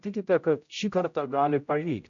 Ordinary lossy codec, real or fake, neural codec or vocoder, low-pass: AAC, 64 kbps; fake; codec, 16 kHz, 0.5 kbps, X-Codec, HuBERT features, trained on general audio; 7.2 kHz